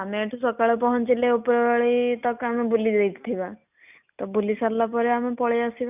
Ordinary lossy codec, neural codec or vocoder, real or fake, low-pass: none; none; real; 3.6 kHz